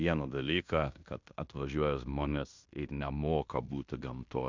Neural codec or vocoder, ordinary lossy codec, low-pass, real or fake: codec, 16 kHz in and 24 kHz out, 0.9 kbps, LongCat-Audio-Codec, fine tuned four codebook decoder; MP3, 64 kbps; 7.2 kHz; fake